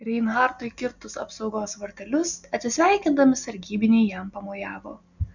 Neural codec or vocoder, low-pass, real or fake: none; 7.2 kHz; real